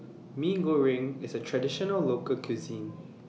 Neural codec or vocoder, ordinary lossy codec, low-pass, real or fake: none; none; none; real